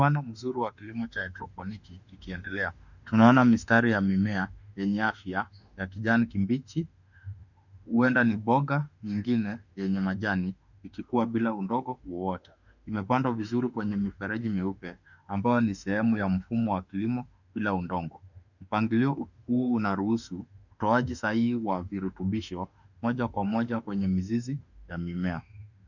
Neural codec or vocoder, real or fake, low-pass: autoencoder, 48 kHz, 32 numbers a frame, DAC-VAE, trained on Japanese speech; fake; 7.2 kHz